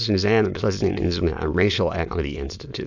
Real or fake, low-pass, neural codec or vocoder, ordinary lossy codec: fake; 7.2 kHz; autoencoder, 22.05 kHz, a latent of 192 numbers a frame, VITS, trained on many speakers; MP3, 64 kbps